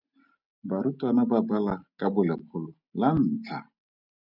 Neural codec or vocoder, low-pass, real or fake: none; 5.4 kHz; real